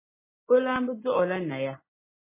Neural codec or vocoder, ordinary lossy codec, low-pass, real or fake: none; MP3, 16 kbps; 3.6 kHz; real